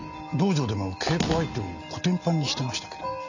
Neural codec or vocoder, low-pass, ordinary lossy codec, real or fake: none; 7.2 kHz; none; real